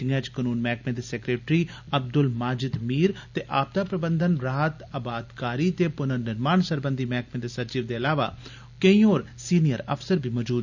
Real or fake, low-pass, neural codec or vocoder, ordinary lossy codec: real; 7.2 kHz; none; none